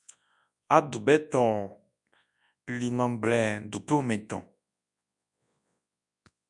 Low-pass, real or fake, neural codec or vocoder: 10.8 kHz; fake; codec, 24 kHz, 0.9 kbps, WavTokenizer, large speech release